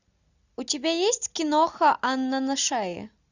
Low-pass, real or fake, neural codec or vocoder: 7.2 kHz; real; none